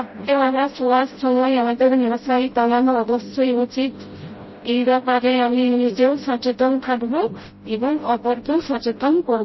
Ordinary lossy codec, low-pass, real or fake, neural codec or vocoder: MP3, 24 kbps; 7.2 kHz; fake; codec, 16 kHz, 0.5 kbps, FreqCodec, smaller model